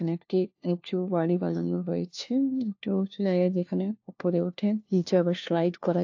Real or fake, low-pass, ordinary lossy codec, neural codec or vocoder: fake; 7.2 kHz; AAC, 48 kbps; codec, 16 kHz, 1 kbps, FunCodec, trained on LibriTTS, 50 frames a second